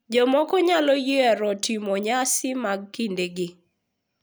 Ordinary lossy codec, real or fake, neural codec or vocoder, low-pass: none; real; none; none